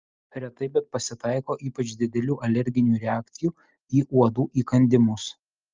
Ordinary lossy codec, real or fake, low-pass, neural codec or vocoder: Opus, 24 kbps; real; 7.2 kHz; none